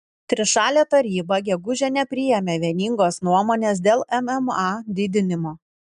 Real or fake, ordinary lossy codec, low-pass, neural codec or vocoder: real; MP3, 96 kbps; 10.8 kHz; none